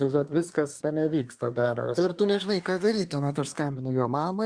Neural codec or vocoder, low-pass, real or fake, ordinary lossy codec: codec, 24 kHz, 1 kbps, SNAC; 9.9 kHz; fake; Opus, 64 kbps